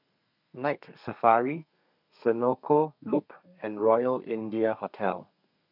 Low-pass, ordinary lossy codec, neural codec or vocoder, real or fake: 5.4 kHz; none; codec, 32 kHz, 1.9 kbps, SNAC; fake